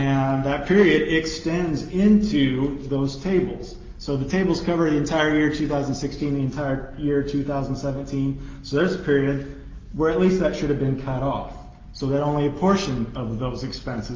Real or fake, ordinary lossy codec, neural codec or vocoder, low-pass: real; Opus, 32 kbps; none; 7.2 kHz